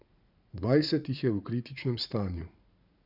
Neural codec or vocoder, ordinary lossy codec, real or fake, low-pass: vocoder, 44.1 kHz, 80 mel bands, Vocos; none; fake; 5.4 kHz